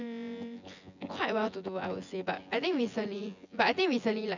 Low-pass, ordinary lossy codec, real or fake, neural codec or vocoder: 7.2 kHz; none; fake; vocoder, 24 kHz, 100 mel bands, Vocos